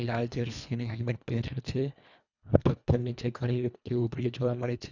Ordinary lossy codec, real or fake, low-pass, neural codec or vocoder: none; fake; 7.2 kHz; codec, 24 kHz, 1.5 kbps, HILCodec